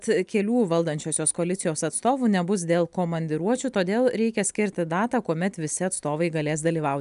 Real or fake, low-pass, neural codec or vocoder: real; 10.8 kHz; none